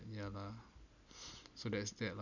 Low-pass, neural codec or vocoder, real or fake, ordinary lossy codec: 7.2 kHz; none; real; none